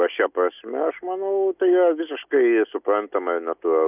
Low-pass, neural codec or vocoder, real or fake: 3.6 kHz; none; real